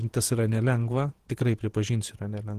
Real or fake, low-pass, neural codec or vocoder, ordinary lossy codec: fake; 14.4 kHz; vocoder, 48 kHz, 128 mel bands, Vocos; Opus, 16 kbps